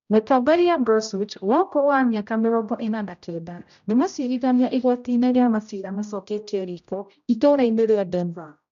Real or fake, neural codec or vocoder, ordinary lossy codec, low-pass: fake; codec, 16 kHz, 0.5 kbps, X-Codec, HuBERT features, trained on general audio; none; 7.2 kHz